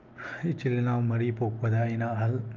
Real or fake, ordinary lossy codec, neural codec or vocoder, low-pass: real; Opus, 24 kbps; none; 7.2 kHz